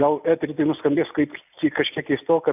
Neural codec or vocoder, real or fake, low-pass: none; real; 3.6 kHz